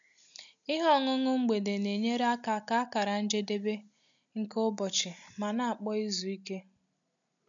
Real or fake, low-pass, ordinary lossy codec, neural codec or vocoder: real; 7.2 kHz; MP3, 64 kbps; none